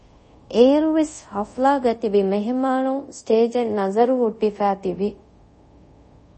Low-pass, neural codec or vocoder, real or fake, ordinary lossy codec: 10.8 kHz; codec, 24 kHz, 0.5 kbps, DualCodec; fake; MP3, 32 kbps